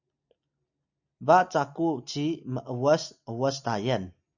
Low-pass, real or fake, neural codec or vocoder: 7.2 kHz; real; none